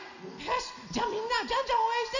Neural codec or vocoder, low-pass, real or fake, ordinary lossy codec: none; 7.2 kHz; real; none